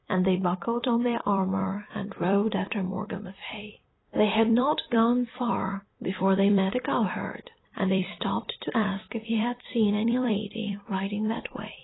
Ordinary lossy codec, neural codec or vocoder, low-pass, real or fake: AAC, 16 kbps; vocoder, 44.1 kHz, 128 mel bands every 256 samples, BigVGAN v2; 7.2 kHz; fake